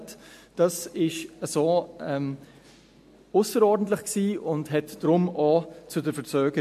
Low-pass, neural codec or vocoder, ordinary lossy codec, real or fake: 14.4 kHz; none; MP3, 64 kbps; real